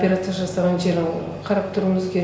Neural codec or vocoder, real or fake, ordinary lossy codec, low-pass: none; real; none; none